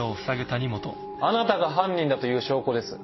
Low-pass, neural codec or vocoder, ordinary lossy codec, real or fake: 7.2 kHz; none; MP3, 24 kbps; real